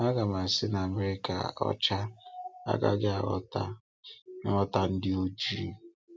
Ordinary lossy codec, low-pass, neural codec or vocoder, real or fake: none; none; none; real